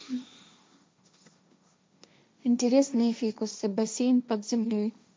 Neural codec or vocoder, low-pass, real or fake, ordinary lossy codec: codec, 16 kHz, 1.1 kbps, Voila-Tokenizer; none; fake; none